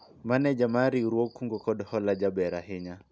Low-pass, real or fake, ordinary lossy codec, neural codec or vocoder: none; real; none; none